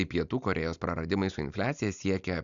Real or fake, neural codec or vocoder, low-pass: real; none; 7.2 kHz